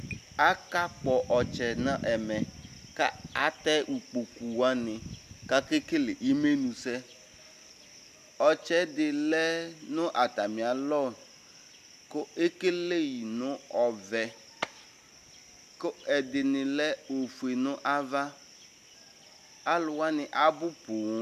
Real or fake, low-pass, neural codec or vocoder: real; 14.4 kHz; none